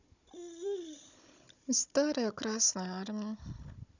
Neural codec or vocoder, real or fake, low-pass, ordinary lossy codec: codec, 16 kHz, 16 kbps, FunCodec, trained on Chinese and English, 50 frames a second; fake; 7.2 kHz; none